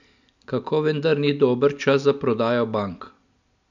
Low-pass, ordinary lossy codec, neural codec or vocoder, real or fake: 7.2 kHz; none; none; real